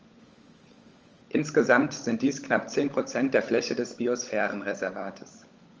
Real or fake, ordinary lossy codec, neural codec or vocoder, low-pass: fake; Opus, 24 kbps; codec, 16 kHz, 16 kbps, FunCodec, trained on LibriTTS, 50 frames a second; 7.2 kHz